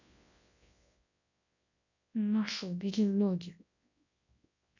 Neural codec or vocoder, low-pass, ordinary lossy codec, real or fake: codec, 24 kHz, 0.9 kbps, WavTokenizer, large speech release; 7.2 kHz; none; fake